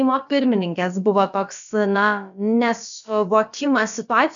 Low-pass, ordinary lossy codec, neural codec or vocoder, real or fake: 7.2 kHz; MP3, 96 kbps; codec, 16 kHz, about 1 kbps, DyCAST, with the encoder's durations; fake